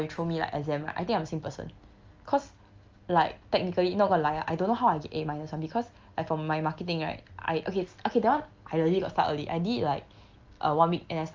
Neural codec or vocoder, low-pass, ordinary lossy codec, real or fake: none; 7.2 kHz; Opus, 24 kbps; real